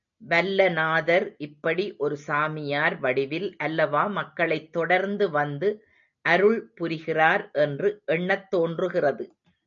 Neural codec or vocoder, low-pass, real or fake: none; 7.2 kHz; real